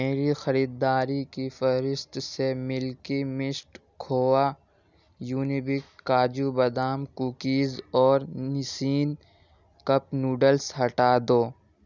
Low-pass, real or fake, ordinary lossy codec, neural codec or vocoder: 7.2 kHz; real; none; none